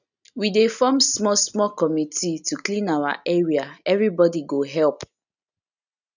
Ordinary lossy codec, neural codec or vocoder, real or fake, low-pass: none; none; real; 7.2 kHz